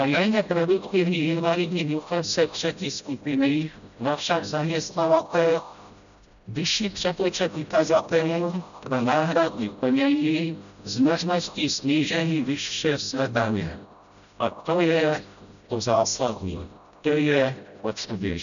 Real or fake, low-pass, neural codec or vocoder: fake; 7.2 kHz; codec, 16 kHz, 0.5 kbps, FreqCodec, smaller model